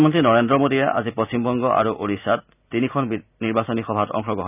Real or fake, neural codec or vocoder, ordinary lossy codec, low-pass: real; none; none; 3.6 kHz